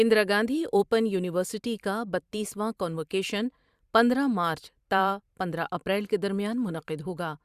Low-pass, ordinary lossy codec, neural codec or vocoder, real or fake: 14.4 kHz; Opus, 64 kbps; vocoder, 44.1 kHz, 128 mel bands every 512 samples, BigVGAN v2; fake